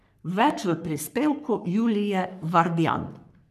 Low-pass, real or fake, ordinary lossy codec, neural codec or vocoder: 14.4 kHz; fake; none; codec, 44.1 kHz, 3.4 kbps, Pupu-Codec